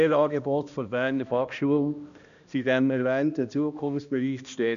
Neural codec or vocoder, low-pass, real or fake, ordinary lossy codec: codec, 16 kHz, 1 kbps, X-Codec, HuBERT features, trained on balanced general audio; 7.2 kHz; fake; none